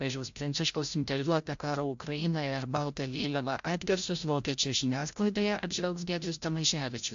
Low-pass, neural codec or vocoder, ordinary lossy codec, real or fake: 7.2 kHz; codec, 16 kHz, 0.5 kbps, FreqCodec, larger model; AAC, 64 kbps; fake